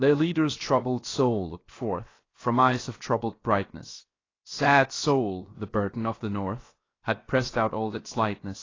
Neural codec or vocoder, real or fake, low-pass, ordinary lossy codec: codec, 16 kHz, 0.7 kbps, FocalCodec; fake; 7.2 kHz; AAC, 32 kbps